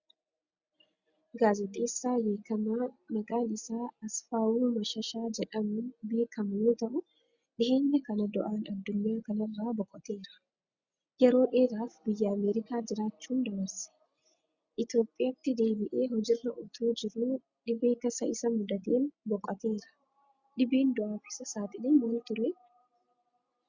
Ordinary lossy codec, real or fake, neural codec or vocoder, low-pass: Opus, 64 kbps; real; none; 7.2 kHz